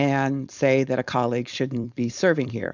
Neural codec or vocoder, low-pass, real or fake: codec, 16 kHz, 4.8 kbps, FACodec; 7.2 kHz; fake